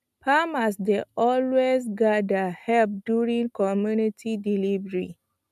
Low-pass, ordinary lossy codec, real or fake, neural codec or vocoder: 14.4 kHz; none; real; none